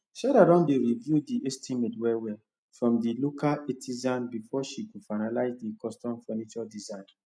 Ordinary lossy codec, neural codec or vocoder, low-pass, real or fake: none; none; none; real